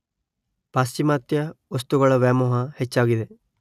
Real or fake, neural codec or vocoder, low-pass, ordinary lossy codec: real; none; 14.4 kHz; none